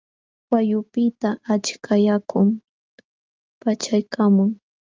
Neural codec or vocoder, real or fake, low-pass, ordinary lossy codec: none; real; 7.2 kHz; Opus, 32 kbps